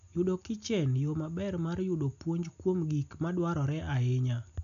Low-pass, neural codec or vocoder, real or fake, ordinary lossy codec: 7.2 kHz; none; real; none